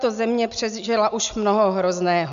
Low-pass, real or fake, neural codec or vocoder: 7.2 kHz; real; none